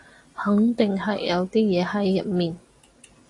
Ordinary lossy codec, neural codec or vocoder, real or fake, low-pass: AAC, 48 kbps; none; real; 10.8 kHz